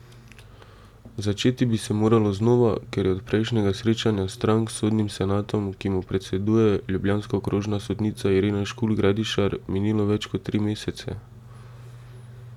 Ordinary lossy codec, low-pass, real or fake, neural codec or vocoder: none; 19.8 kHz; real; none